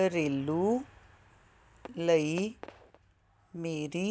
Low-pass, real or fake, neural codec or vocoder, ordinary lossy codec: none; real; none; none